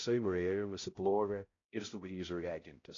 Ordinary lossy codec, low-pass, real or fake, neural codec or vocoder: AAC, 32 kbps; 7.2 kHz; fake; codec, 16 kHz, 0.5 kbps, X-Codec, HuBERT features, trained on balanced general audio